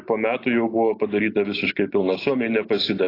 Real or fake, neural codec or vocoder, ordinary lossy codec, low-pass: real; none; AAC, 32 kbps; 5.4 kHz